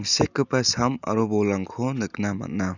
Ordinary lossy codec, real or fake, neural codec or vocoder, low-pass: none; real; none; 7.2 kHz